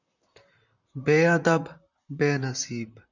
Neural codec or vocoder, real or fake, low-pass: vocoder, 44.1 kHz, 128 mel bands, Pupu-Vocoder; fake; 7.2 kHz